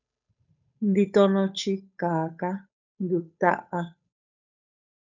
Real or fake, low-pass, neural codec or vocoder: fake; 7.2 kHz; codec, 16 kHz, 8 kbps, FunCodec, trained on Chinese and English, 25 frames a second